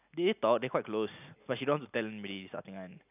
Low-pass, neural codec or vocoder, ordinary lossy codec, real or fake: 3.6 kHz; none; none; real